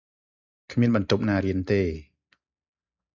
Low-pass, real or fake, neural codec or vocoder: 7.2 kHz; real; none